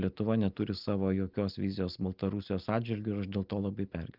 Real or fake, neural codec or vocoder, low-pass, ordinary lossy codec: real; none; 5.4 kHz; Opus, 24 kbps